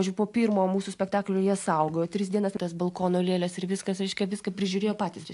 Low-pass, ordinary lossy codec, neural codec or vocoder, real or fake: 10.8 kHz; AAC, 64 kbps; none; real